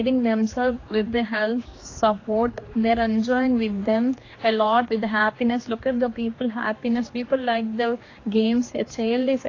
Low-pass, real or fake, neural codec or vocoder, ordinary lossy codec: 7.2 kHz; fake; codec, 16 kHz, 2 kbps, X-Codec, HuBERT features, trained on general audio; AAC, 32 kbps